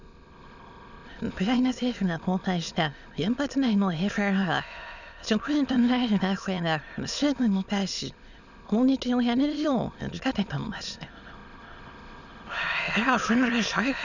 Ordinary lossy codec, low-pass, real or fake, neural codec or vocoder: none; 7.2 kHz; fake; autoencoder, 22.05 kHz, a latent of 192 numbers a frame, VITS, trained on many speakers